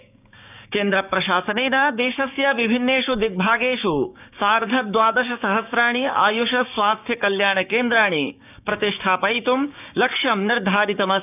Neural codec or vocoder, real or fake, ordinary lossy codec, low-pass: codec, 16 kHz, 6 kbps, DAC; fake; none; 3.6 kHz